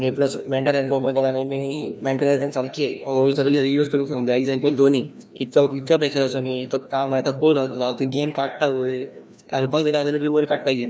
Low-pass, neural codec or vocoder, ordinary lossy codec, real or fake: none; codec, 16 kHz, 1 kbps, FreqCodec, larger model; none; fake